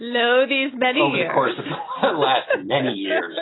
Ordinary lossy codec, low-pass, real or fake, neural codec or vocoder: AAC, 16 kbps; 7.2 kHz; real; none